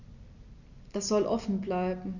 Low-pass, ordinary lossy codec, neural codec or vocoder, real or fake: 7.2 kHz; none; none; real